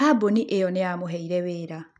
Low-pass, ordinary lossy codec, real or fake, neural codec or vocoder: none; none; real; none